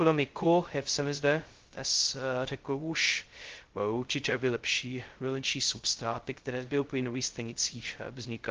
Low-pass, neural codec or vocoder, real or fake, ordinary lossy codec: 7.2 kHz; codec, 16 kHz, 0.2 kbps, FocalCodec; fake; Opus, 16 kbps